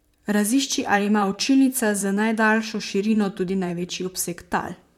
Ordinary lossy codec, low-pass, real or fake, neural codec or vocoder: MP3, 96 kbps; 19.8 kHz; fake; vocoder, 44.1 kHz, 128 mel bands, Pupu-Vocoder